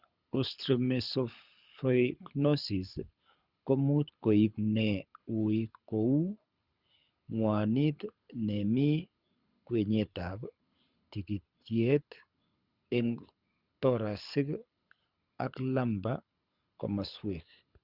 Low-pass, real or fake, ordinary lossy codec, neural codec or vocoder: 5.4 kHz; fake; Opus, 64 kbps; codec, 24 kHz, 6 kbps, HILCodec